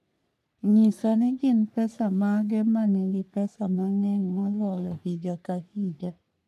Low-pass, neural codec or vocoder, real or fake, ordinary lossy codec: 14.4 kHz; codec, 44.1 kHz, 3.4 kbps, Pupu-Codec; fake; none